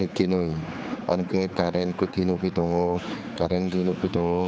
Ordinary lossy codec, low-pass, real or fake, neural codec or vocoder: none; none; fake; codec, 16 kHz, 4 kbps, X-Codec, HuBERT features, trained on general audio